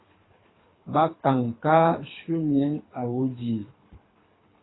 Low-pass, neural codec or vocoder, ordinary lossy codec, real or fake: 7.2 kHz; codec, 24 kHz, 3 kbps, HILCodec; AAC, 16 kbps; fake